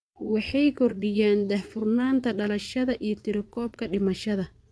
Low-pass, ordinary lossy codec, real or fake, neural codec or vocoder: none; none; fake; vocoder, 22.05 kHz, 80 mel bands, Vocos